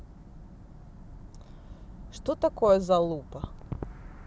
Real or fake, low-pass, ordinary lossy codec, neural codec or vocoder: real; none; none; none